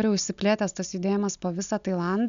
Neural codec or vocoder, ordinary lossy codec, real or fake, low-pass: none; MP3, 96 kbps; real; 7.2 kHz